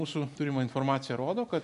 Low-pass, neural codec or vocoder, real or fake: 10.8 kHz; none; real